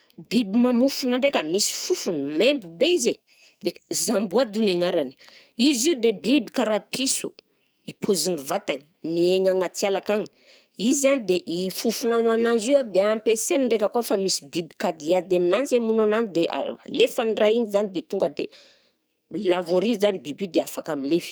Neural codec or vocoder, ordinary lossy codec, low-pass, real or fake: codec, 44.1 kHz, 2.6 kbps, SNAC; none; none; fake